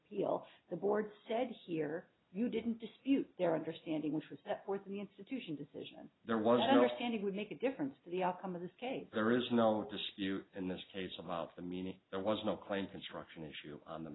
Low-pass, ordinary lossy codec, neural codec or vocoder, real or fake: 7.2 kHz; AAC, 16 kbps; none; real